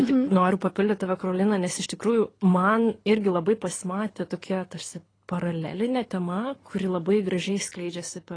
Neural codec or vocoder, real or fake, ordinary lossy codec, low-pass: codec, 24 kHz, 6 kbps, HILCodec; fake; AAC, 32 kbps; 9.9 kHz